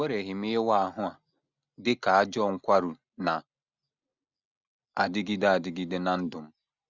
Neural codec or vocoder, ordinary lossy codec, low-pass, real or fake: none; none; 7.2 kHz; real